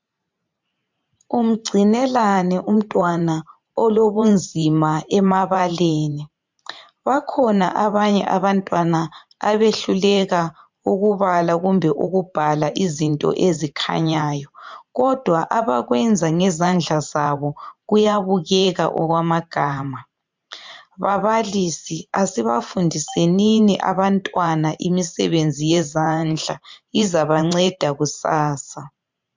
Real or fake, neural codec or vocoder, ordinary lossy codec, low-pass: fake; vocoder, 44.1 kHz, 128 mel bands every 512 samples, BigVGAN v2; MP3, 64 kbps; 7.2 kHz